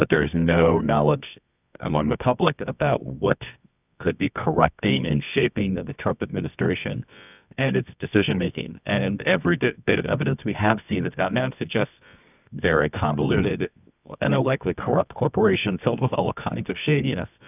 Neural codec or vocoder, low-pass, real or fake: codec, 24 kHz, 0.9 kbps, WavTokenizer, medium music audio release; 3.6 kHz; fake